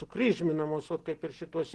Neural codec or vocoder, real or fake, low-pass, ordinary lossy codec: none; real; 10.8 kHz; Opus, 16 kbps